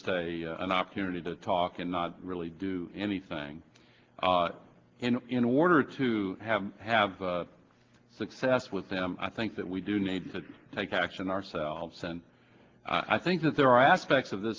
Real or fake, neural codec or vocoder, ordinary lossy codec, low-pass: real; none; Opus, 16 kbps; 7.2 kHz